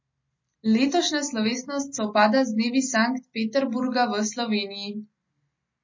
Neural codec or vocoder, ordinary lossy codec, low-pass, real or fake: none; MP3, 32 kbps; 7.2 kHz; real